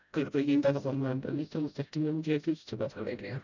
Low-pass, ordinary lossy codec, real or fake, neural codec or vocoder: 7.2 kHz; none; fake; codec, 16 kHz, 0.5 kbps, FreqCodec, smaller model